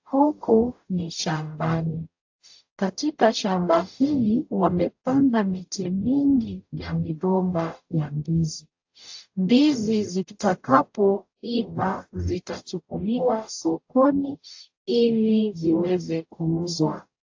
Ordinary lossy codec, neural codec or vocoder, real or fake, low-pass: AAC, 48 kbps; codec, 44.1 kHz, 0.9 kbps, DAC; fake; 7.2 kHz